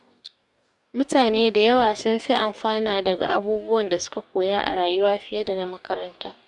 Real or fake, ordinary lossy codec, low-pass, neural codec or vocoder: fake; none; 10.8 kHz; codec, 44.1 kHz, 2.6 kbps, DAC